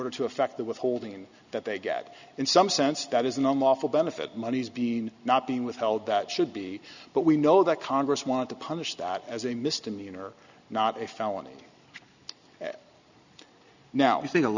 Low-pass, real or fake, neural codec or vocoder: 7.2 kHz; real; none